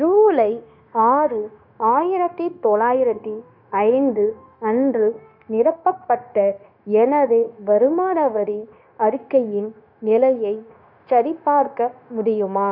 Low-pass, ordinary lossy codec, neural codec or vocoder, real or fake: 5.4 kHz; none; codec, 16 kHz, 0.9 kbps, LongCat-Audio-Codec; fake